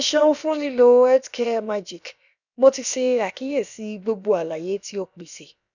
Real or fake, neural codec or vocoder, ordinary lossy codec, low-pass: fake; codec, 16 kHz, about 1 kbps, DyCAST, with the encoder's durations; none; 7.2 kHz